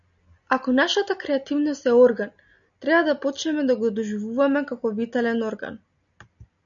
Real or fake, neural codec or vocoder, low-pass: real; none; 7.2 kHz